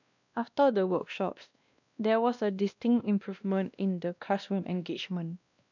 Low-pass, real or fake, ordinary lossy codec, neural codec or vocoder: 7.2 kHz; fake; none; codec, 16 kHz, 1 kbps, X-Codec, WavLM features, trained on Multilingual LibriSpeech